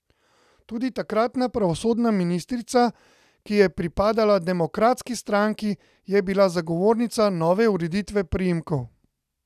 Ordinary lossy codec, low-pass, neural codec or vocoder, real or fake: none; 14.4 kHz; none; real